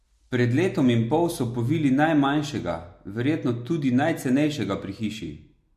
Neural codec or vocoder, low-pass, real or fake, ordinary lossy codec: none; 14.4 kHz; real; MP3, 64 kbps